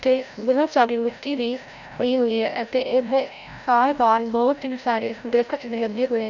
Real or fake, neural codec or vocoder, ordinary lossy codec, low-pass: fake; codec, 16 kHz, 0.5 kbps, FreqCodec, larger model; none; 7.2 kHz